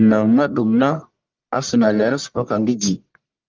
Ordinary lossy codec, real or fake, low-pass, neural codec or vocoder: Opus, 24 kbps; fake; 7.2 kHz; codec, 44.1 kHz, 1.7 kbps, Pupu-Codec